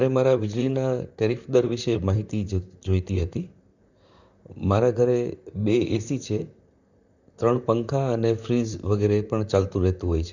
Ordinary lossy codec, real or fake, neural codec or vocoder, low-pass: none; fake; vocoder, 44.1 kHz, 128 mel bands, Pupu-Vocoder; 7.2 kHz